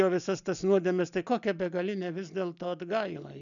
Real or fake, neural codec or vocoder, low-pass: real; none; 7.2 kHz